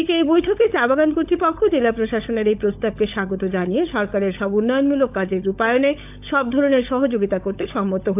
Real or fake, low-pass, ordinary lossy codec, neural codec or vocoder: fake; 3.6 kHz; AAC, 32 kbps; codec, 16 kHz, 16 kbps, FunCodec, trained on LibriTTS, 50 frames a second